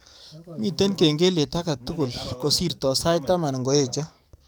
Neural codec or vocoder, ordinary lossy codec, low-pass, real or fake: codec, 44.1 kHz, 7.8 kbps, DAC; none; none; fake